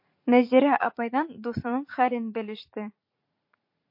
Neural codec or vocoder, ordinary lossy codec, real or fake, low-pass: vocoder, 44.1 kHz, 80 mel bands, Vocos; MP3, 32 kbps; fake; 5.4 kHz